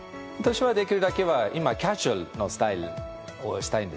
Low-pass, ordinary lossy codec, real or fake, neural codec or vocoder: none; none; real; none